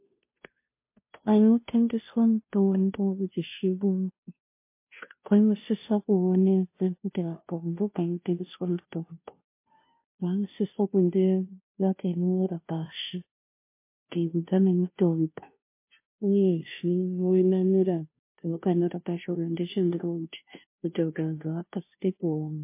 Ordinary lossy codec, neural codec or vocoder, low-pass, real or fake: MP3, 24 kbps; codec, 16 kHz, 0.5 kbps, FunCodec, trained on Chinese and English, 25 frames a second; 3.6 kHz; fake